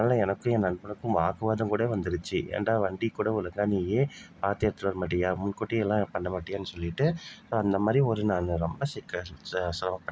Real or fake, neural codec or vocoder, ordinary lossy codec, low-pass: real; none; none; none